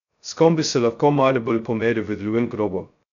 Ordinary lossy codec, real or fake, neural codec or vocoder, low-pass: none; fake; codec, 16 kHz, 0.2 kbps, FocalCodec; 7.2 kHz